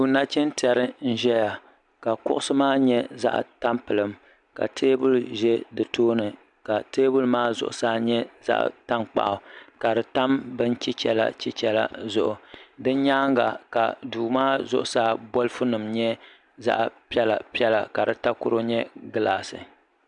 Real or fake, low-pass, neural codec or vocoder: real; 10.8 kHz; none